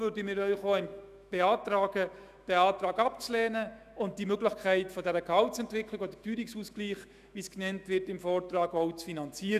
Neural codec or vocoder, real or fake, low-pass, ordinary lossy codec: autoencoder, 48 kHz, 128 numbers a frame, DAC-VAE, trained on Japanese speech; fake; 14.4 kHz; none